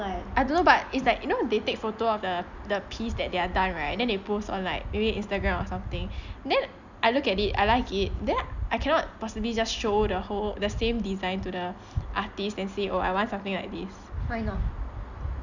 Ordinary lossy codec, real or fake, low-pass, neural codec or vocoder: none; real; 7.2 kHz; none